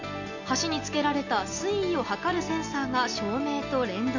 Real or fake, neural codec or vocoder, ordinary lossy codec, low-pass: real; none; none; 7.2 kHz